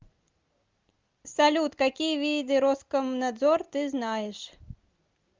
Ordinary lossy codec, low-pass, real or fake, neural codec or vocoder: Opus, 32 kbps; 7.2 kHz; real; none